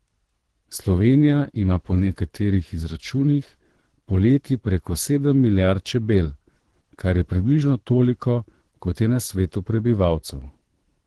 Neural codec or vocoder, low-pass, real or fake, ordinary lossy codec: codec, 24 kHz, 3 kbps, HILCodec; 10.8 kHz; fake; Opus, 16 kbps